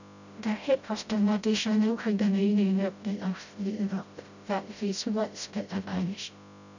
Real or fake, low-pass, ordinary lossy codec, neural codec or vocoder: fake; 7.2 kHz; none; codec, 16 kHz, 0.5 kbps, FreqCodec, smaller model